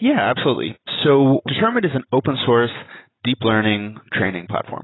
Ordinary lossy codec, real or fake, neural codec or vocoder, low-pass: AAC, 16 kbps; real; none; 7.2 kHz